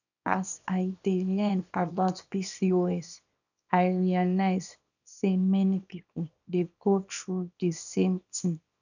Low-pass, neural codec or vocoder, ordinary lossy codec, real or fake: 7.2 kHz; codec, 24 kHz, 0.9 kbps, WavTokenizer, small release; none; fake